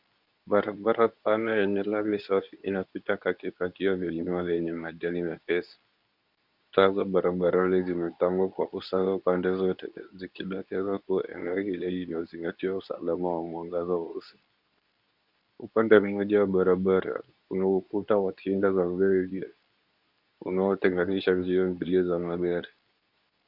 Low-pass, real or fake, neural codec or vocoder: 5.4 kHz; fake; codec, 24 kHz, 0.9 kbps, WavTokenizer, medium speech release version 2